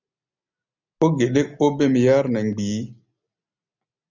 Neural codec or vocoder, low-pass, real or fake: none; 7.2 kHz; real